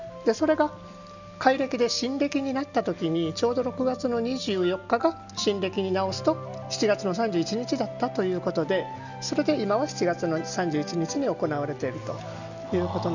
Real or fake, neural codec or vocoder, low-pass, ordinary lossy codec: real; none; 7.2 kHz; none